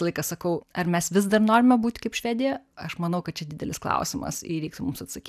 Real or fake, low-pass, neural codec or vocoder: real; 14.4 kHz; none